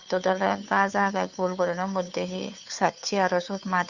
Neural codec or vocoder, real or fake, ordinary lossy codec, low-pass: codec, 16 kHz, 2 kbps, FunCodec, trained on Chinese and English, 25 frames a second; fake; none; 7.2 kHz